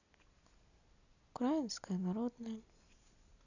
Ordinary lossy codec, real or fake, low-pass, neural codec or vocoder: none; real; 7.2 kHz; none